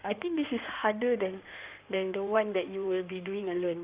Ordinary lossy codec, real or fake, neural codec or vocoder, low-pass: Opus, 64 kbps; fake; codec, 16 kHz in and 24 kHz out, 2.2 kbps, FireRedTTS-2 codec; 3.6 kHz